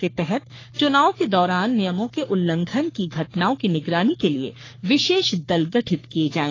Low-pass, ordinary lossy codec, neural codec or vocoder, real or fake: 7.2 kHz; AAC, 32 kbps; codec, 44.1 kHz, 3.4 kbps, Pupu-Codec; fake